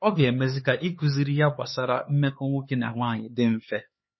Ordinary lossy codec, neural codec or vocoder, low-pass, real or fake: MP3, 24 kbps; codec, 16 kHz, 4 kbps, X-Codec, HuBERT features, trained on LibriSpeech; 7.2 kHz; fake